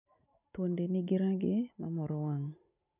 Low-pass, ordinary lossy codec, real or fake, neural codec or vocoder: 3.6 kHz; none; real; none